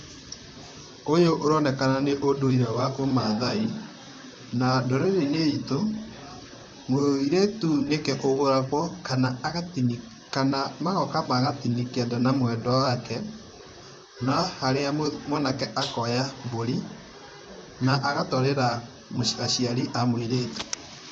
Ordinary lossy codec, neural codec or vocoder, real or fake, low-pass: none; vocoder, 44.1 kHz, 128 mel bands, Pupu-Vocoder; fake; 9.9 kHz